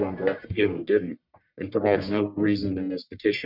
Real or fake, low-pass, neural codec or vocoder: fake; 5.4 kHz; codec, 44.1 kHz, 1.7 kbps, Pupu-Codec